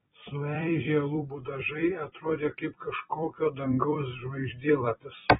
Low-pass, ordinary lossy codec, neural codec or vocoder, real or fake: 19.8 kHz; AAC, 16 kbps; vocoder, 44.1 kHz, 128 mel bands every 256 samples, BigVGAN v2; fake